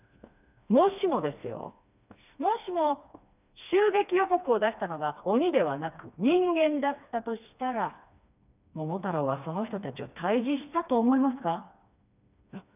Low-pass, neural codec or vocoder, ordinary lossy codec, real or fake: 3.6 kHz; codec, 16 kHz, 2 kbps, FreqCodec, smaller model; none; fake